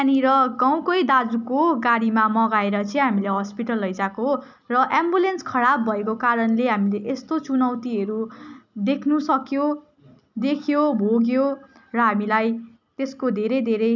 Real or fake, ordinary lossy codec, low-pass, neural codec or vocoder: real; none; 7.2 kHz; none